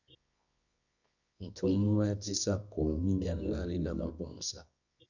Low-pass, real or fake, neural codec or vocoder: 7.2 kHz; fake; codec, 24 kHz, 0.9 kbps, WavTokenizer, medium music audio release